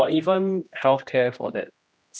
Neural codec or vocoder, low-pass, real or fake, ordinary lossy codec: codec, 16 kHz, 1 kbps, X-Codec, HuBERT features, trained on general audio; none; fake; none